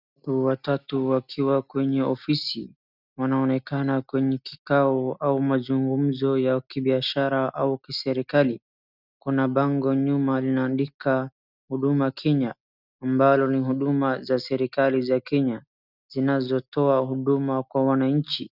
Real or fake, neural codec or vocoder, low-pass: real; none; 5.4 kHz